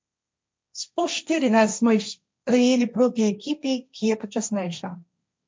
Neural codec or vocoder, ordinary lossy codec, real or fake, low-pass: codec, 16 kHz, 1.1 kbps, Voila-Tokenizer; none; fake; none